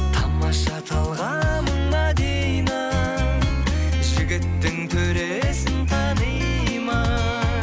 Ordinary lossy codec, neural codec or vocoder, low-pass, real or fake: none; none; none; real